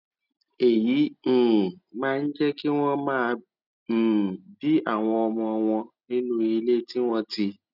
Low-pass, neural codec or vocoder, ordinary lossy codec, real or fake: 5.4 kHz; none; none; real